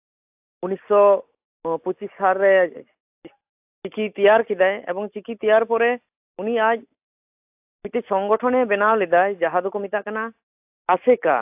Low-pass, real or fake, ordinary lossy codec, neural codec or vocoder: 3.6 kHz; real; AAC, 32 kbps; none